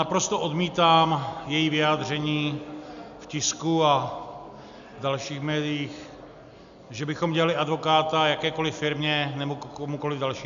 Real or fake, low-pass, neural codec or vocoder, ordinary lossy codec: real; 7.2 kHz; none; MP3, 96 kbps